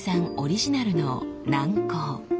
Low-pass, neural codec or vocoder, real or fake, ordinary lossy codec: none; none; real; none